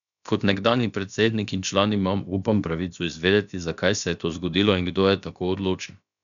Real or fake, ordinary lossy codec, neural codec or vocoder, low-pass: fake; none; codec, 16 kHz, about 1 kbps, DyCAST, with the encoder's durations; 7.2 kHz